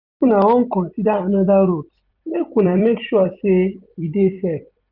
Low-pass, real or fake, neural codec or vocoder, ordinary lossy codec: 5.4 kHz; fake; vocoder, 44.1 kHz, 128 mel bands every 256 samples, BigVGAN v2; none